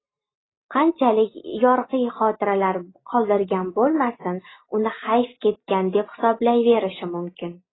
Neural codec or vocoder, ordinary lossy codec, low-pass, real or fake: none; AAC, 16 kbps; 7.2 kHz; real